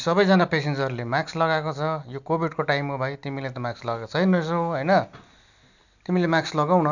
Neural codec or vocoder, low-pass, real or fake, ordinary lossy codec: none; 7.2 kHz; real; none